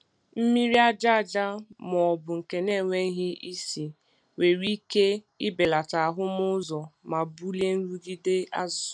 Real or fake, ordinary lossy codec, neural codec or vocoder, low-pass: real; none; none; 9.9 kHz